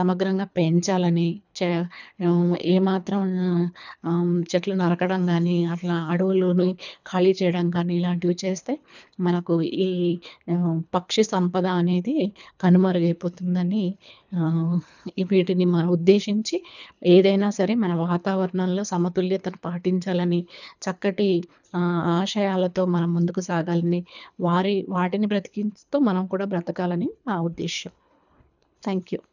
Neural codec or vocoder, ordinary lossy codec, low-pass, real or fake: codec, 24 kHz, 3 kbps, HILCodec; none; 7.2 kHz; fake